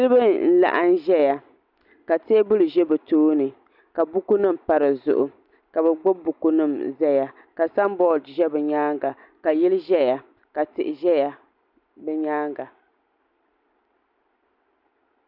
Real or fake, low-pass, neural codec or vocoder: real; 5.4 kHz; none